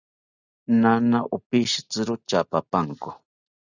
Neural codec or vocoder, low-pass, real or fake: none; 7.2 kHz; real